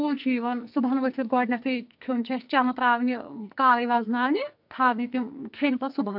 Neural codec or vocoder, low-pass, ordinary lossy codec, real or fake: codec, 44.1 kHz, 2.6 kbps, SNAC; 5.4 kHz; AAC, 48 kbps; fake